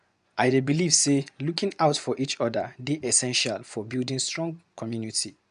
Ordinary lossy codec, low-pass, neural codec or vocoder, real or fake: none; 10.8 kHz; vocoder, 24 kHz, 100 mel bands, Vocos; fake